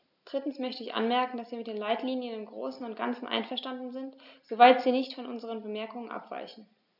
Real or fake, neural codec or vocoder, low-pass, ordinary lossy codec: real; none; 5.4 kHz; none